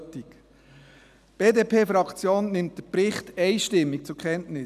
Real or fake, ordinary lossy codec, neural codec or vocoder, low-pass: real; none; none; 14.4 kHz